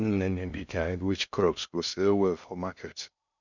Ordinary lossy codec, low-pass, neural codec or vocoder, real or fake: none; 7.2 kHz; codec, 16 kHz in and 24 kHz out, 0.6 kbps, FocalCodec, streaming, 4096 codes; fake